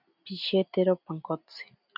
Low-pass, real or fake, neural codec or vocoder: 5.4 kHz; real; none